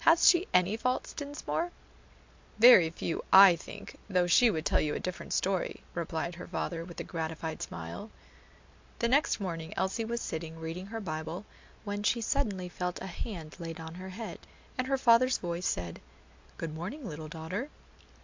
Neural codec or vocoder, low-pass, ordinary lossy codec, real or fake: none; 7.2 kHz; MP3, 64 kbps; real